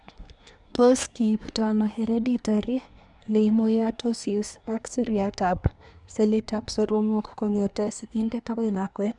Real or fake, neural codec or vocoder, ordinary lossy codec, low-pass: fake; codec, 24 kHz, 1 kbps, SNAC; none; 10.8 kHz